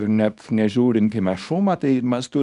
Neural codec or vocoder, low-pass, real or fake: codec, 24 kHz, 0.9 kbps, WavTokenizer, small release; 10.8 kHz; fake